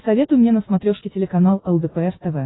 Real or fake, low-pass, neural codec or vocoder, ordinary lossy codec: real; 7.2 kHz; none; AAC, 16 kbps